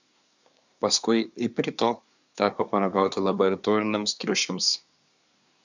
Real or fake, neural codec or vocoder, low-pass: fake; codec, 24 kHz, 1 kbps, SNAC; 7.2 kHz